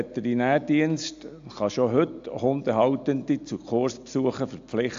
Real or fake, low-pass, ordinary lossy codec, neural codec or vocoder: real; 7.2 kHz; AAC, 64 kbps; none